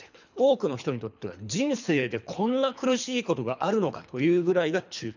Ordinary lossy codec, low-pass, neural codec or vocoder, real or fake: none; 7.2 kHz; codec, 24 kHz, 3 kbps, HILCodec; fake